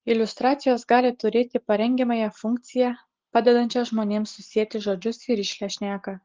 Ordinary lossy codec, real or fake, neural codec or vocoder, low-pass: Opus, 16 kbps; real; none; 7.2 kHz